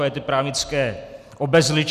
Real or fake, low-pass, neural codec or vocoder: real; 14.4 kHz; none